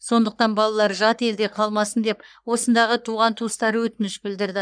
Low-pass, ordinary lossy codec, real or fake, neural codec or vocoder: 9.9 kHz; none; fake; codec, 44.1 kHz, 3.4 kbps, Pupu-Codec